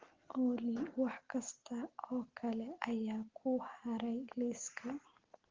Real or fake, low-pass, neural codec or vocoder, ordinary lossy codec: real; 7.2 kHz; none; Opus, 16 kbps